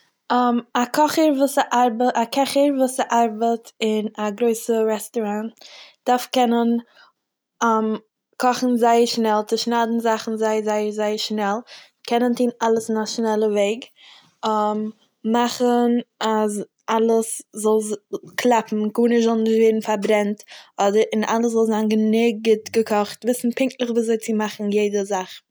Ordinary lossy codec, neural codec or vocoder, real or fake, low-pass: none; none; real; none